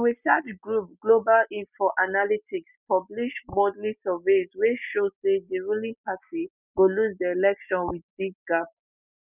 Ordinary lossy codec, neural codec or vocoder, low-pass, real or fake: none; none; 3.6 kHz; real